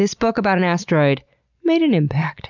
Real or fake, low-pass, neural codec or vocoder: real; 7.2 kHz; none